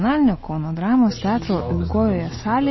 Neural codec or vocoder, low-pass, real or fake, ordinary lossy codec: none; 7.2 kHz; real; MP3, 24 kbps